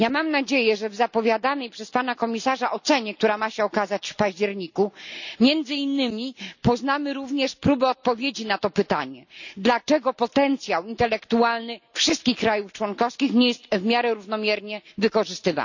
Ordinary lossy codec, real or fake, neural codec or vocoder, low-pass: none; real; none; 7.2 kHz